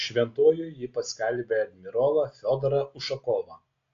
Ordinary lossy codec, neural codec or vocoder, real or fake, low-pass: AAC, 48 kbps; none; real; 7.2 kHz